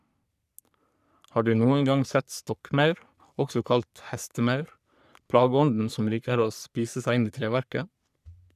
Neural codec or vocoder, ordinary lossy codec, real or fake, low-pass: codec, 44.1 kHz, 3.4 kbps, Pupu-Codec; none; fake; 14.4 kHz